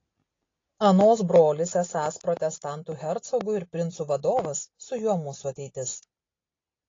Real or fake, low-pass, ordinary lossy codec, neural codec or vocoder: real; 7.2 kHz; AAC, 32 kbps; none